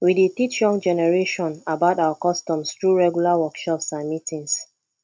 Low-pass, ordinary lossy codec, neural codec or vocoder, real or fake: none; none; none; real